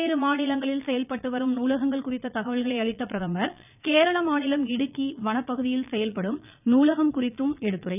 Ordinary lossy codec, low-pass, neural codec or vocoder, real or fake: none; 3.6 kHz; vocoder, 22.05 kHz, 80 mel bands, Vocos; fake